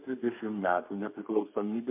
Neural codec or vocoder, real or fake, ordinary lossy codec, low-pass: codec, 32 kHz, 1.9 kbps, SNAC; fake; MP3, 32 kbps; 3.6 kHz